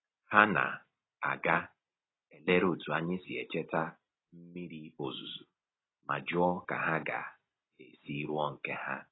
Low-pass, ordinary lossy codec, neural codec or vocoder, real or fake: 7.2 kHz; AAC, 16 kbps; none; real